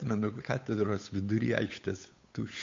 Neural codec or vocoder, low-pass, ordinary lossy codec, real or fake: codec, 16 kHz, 8 kbps, FunCodec, trained on Chinese and English, 25 frames a second; 7.2 kHz; MP3, 48 kbps; fake